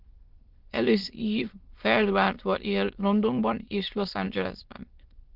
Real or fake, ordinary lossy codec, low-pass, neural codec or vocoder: fake; Opus, 16 kbps; 5.4 kHz; autoencoder, 22.05 kHz, a latent of 192 numbers a frame, VITS, trained on many speakers